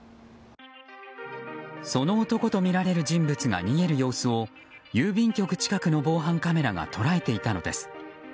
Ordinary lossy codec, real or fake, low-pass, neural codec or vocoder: none; real; none; none